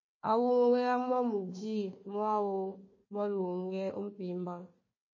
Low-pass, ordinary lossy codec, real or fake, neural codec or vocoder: 7.2 kHz; MP3, 32 kbps; fake; codec, 16 kHz, 1 kbps, FunCodec, trained on Chinese and English, 50 frames a second